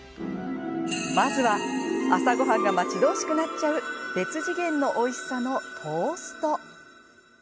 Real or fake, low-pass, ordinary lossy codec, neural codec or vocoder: real; none; none; none